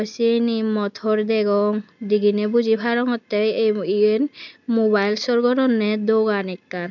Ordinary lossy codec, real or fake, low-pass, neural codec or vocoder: none; real; 7.2 kHz; none